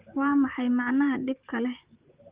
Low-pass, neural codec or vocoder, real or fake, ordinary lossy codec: 3.6 kHz; vocoder, 44.1 kHz, 128 mel bands, Pupu-Vocoder; fake; Opus, 32 kbps